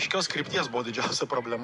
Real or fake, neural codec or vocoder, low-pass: fake; vocoder, 24 kHz, 100 mel bands, Vocos; 10.8 kHz